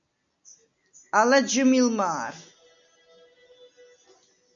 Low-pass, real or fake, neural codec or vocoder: 7.2 kHz; real; none